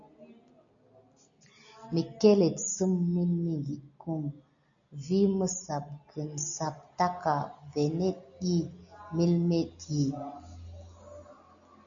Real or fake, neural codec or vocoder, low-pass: real; none; 7.2 kHz